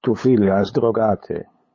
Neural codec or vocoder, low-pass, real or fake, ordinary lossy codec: codec, 16 kHz, 8 kbps, FunCodec, trained on LibriTTS, 25 frames a second; 7.2 kHz; fake; MP3, 32 kbps